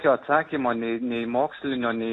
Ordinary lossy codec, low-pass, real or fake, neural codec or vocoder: AAC, 32 kbps; 9.9 kHz; real; none